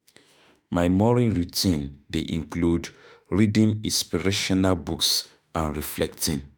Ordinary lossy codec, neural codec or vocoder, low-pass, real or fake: none; autoencoder, 48 kHz, 32 numbers a frame, DAC-VAE, trained on Japanese speech; none; fake